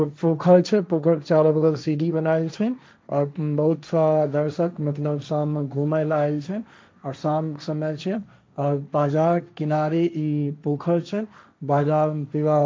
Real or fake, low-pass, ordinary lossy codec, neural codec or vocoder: fake; none; none; codec, 16 kHz, 1.1 kbps, Voila-Tokenizer